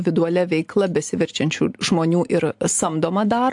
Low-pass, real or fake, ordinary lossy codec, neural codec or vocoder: 10.8 kHz; real; AAC, 64 kbps; none